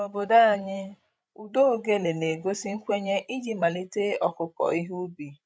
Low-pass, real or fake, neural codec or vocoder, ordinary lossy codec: none; fake; codec, 16 kHz, 16 kbps, FreqCodec, larger model; none